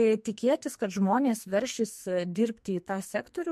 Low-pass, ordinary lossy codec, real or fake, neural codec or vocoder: 14.4 kHz; MP3, 64 kbps; fake; codec, 44.1 kHz, 2.6 kbps, SNAC